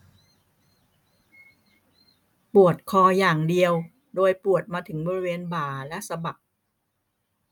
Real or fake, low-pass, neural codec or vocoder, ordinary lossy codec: real; 19.8 kHz; none; none